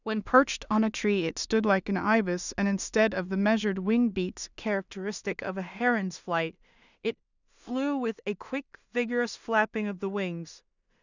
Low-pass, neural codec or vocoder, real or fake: 7.2 kHz; codec, 16 kHz in and 24 kHz out, 0.4 kbps, LongCat-Audio-Codec, two codebook decoder; fake